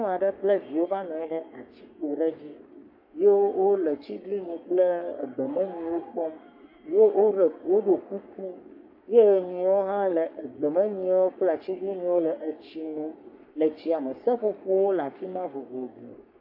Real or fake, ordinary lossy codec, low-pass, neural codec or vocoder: fake; AAC, 48 kbps; 5.4 kHz; codec, 44.1 kHz, 3.4 kbps, Pupu-Codec